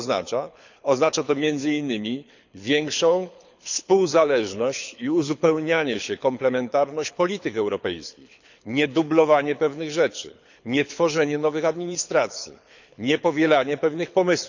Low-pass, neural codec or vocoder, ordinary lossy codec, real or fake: 7.2 kHz; codec, 24 kHz, 6 kbps, HILCodec; none; fake